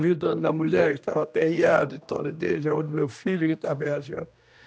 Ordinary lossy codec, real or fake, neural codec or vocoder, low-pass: none; fake; codec, 16 kHz, 1 kbps, X-Codec, HuBERT features, trained on general audio; none